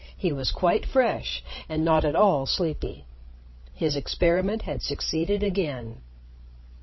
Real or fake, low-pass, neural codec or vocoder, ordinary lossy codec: fake; 7.2 kHz; codec, 16 kHz, 16 kbps, FreqCodec, larger model; MP3, 24 kbps